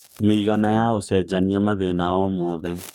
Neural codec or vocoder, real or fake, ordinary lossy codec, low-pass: codec, 44.1 kHz, 2.6 kbps, DAC; fake; none; 19.8 kHz